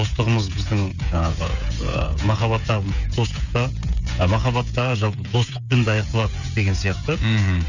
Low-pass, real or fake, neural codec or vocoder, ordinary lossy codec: 7.2 kHz; fake; codec, 16 kHz, 16 kbps, FreqCodec, smaller model; none